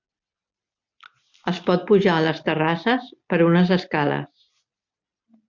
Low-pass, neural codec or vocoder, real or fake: 7.2 kHz; none; real